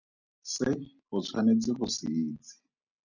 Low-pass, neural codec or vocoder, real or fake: 7.2 kHz; none; real